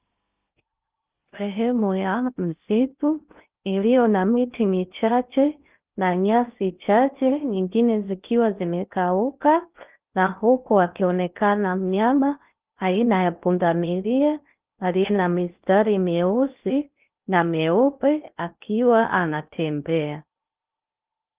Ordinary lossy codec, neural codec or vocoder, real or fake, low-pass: Opus, 32 kbps; codec, 16 kHz in and 24 kHz out, 0.6 kbps, FocalCodec, streaming, 2048 codes; fake; 3.6 kHz